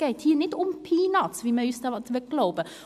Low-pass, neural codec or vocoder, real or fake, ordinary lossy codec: 14.4 kHz; none; real; AAC, 96 kbps